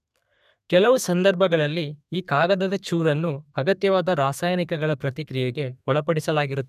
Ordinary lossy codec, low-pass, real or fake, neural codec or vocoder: none; 14.4 kHz; fake; codec, 32 kHz, 1.9 kbps, SNAC